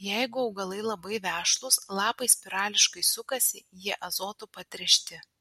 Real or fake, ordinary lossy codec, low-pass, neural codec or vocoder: real; MP3, 64 kbps; 19.8 kHz; none